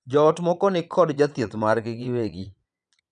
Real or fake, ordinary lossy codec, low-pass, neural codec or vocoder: fake; none; 9.9 kHz; vocoder, 22.05 kHz, 80 mel bands, Vocos